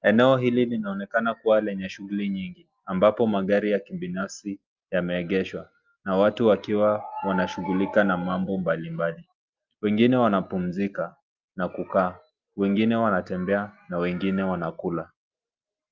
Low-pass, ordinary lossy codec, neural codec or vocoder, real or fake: 7.2 kHz; Opus, 24 kbps; none; real